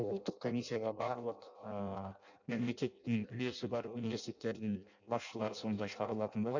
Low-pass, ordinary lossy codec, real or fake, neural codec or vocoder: 7.2 kHz; none; fake; codec, 16 kHz in and 24 kHz out, 0.6 kbps, FireRedTTS-2 codec